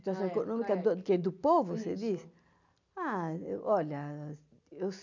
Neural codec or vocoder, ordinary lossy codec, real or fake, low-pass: none; none; real; 7.2 kHz